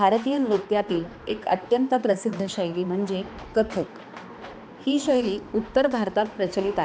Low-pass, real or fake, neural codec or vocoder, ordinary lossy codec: none; fake; codec, 16 kHz, 2 kbps, X-Codec, HuBERT features, trained on balanced general audio; none